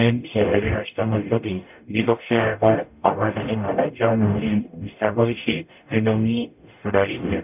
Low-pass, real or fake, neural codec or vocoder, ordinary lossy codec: 3.6 kHz; fake; codec, 44.1 kHz, 0.9 kbps, DAC; none